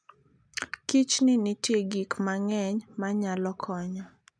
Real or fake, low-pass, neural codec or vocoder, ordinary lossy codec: real; none; none; none